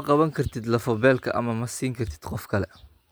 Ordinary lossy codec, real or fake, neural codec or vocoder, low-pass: none; real; none; none